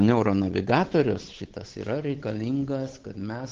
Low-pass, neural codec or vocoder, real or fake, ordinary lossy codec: 7.2 kHz; codec, 16 kHz, 8 kbps, FunCodec, trained on Chinese and English, 25 frames a second; fake; Opus, 16 kbps